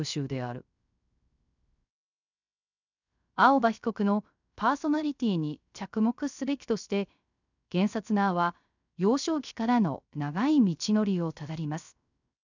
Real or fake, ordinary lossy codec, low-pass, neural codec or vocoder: fake; none; 7.2 kHz; codec, 16 kHz, 0.3 kbps, FocalCodec